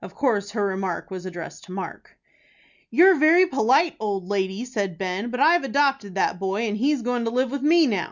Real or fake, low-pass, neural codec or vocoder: real; 7.2 kHz; none